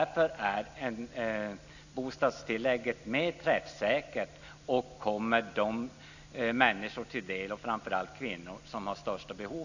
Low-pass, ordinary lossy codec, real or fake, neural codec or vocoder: 7.2 kHz; none; real; none